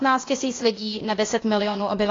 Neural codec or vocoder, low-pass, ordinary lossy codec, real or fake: codec, 16 kHz, 0.8 kbps, ZipCodec; 7.2 kHz; AAC, 32 kbps; fake